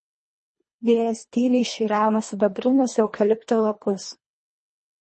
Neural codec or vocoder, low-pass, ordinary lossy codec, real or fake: codec, 24 kHz, 1.5 kbps, HILCodec; 10.8 kHz; MP3, 32 kbps; fake